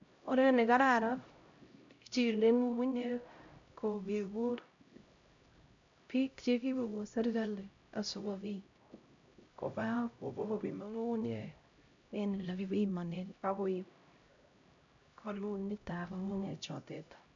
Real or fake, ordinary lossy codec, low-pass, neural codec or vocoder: fake; MP3, 64 kbps; 7.2 kHz; codec, 16 kHz, 0.5 kbps, X-Codec, HuBERT features, trained on LibriSpeech